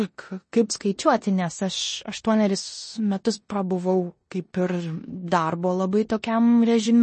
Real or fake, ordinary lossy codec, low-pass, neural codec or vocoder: fake; MP3, 32 kbps; 10.8 kHz; codec, 16 kHz in and 24 kHz out, 0.9 kbps, LongCat-Audio-Codec, four codebook decoder